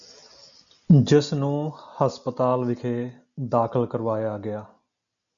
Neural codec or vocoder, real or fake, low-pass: none; real; 7.2 kHz